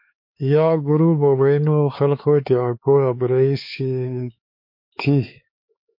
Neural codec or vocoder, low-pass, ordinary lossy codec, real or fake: codec, 16 kHz, 4 kbps, X-Codec, HuBERT features, trained on LibriSpeech; 5.4 kHz; MP3, 32 kbps; fake